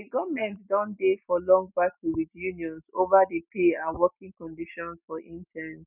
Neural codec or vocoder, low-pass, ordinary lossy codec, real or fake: none; 3.6 kHz; none; real